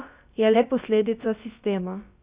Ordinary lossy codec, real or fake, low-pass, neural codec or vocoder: Opus, 64 kbps; fake; 3.6 kHz; codec, 16 kHz, about 1 kbps, DyCAST, with the encoder's durations